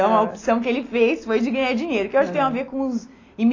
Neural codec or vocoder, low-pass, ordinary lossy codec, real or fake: none; 7.2 kHz; AAC, 32 kbps; real